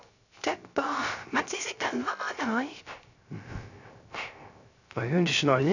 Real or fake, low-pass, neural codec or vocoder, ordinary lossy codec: fake; 7.2 kHz; codec, 16 kHz, 0.3 kbps, FocalCodec; none